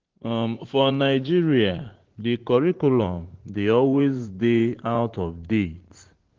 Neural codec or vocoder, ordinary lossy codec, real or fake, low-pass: vocoder, 44.1 kHz, 80 mel bands, Vocos; Opus, 16 kbps; fake; 7.2 kHz